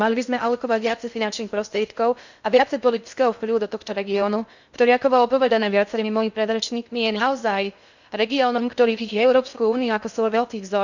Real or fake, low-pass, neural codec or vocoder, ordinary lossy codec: fake; 7.2 kHz; codec, 16 kHz in and 24 kHz out, 0.6 kbps, FocalCodec, streaming, 2048 codes; none